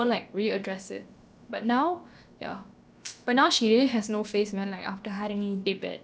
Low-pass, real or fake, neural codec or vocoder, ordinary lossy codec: none; fake; codec, 16 kHz, about 1 kbps, DyCAST, with the encoder's durations; none